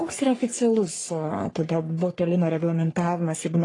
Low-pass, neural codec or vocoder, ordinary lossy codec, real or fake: 10.8 kHz; codec, 44.1 kHz, 3.4 kbps, Pupu-Codec; AAC, 32 kbps; fake